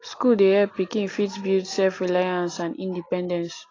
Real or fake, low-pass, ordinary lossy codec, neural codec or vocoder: real; 7.2 kHz; AAC, 32 kbps; none